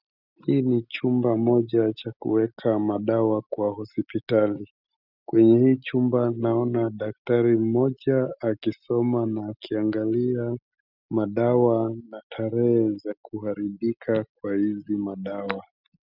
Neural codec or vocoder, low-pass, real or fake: none; 5.4 kHz; real